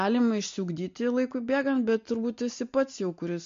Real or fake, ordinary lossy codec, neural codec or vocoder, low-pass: real; MP3, 48 kbps; none; 7.2 kHz